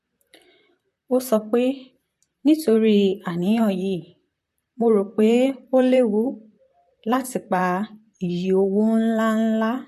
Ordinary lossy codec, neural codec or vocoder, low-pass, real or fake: MP3, 64 kbps; vocoder, 44.1 kHz, 128 mel bands, Pupu-Vocoder; 14.4 kHz; fake